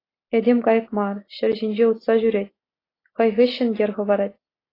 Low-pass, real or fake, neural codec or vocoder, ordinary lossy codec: 5.4 kHz; real; none; AAC, 24 kbps